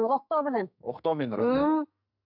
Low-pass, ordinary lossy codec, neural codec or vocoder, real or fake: 5.4 kHz; none; codec, 44.1 kHz, 2.6 kbps, SNAC; fake